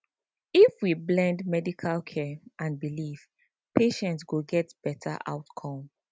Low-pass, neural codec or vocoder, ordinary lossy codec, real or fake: none; none; none; real